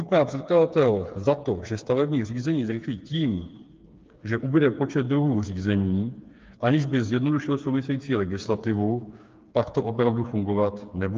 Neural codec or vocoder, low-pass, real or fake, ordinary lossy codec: codec, 16 kHz, 4 kbps, FreqCodec, smaller model; 7.2 kHz; fake; Opus, 24 kbps